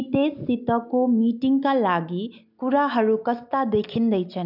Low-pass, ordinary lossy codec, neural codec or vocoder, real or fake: 5.4 kHz; none; none; real